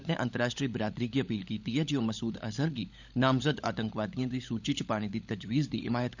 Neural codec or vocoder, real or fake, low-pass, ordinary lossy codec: codec, 16 kHz, 16 kbps, FunCodec, trained on LibriTTS, 50 frames a second; fake; 7.2 kHz; none